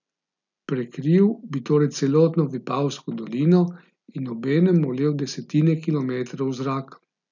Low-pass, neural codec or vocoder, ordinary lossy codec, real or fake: 7.2 kHz; none; none; real